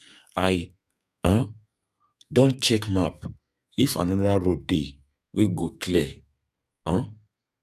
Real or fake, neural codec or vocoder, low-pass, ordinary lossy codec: fake; codec, 32 kHz, 1.9 kbps, SNAC; 14.4 kHz; none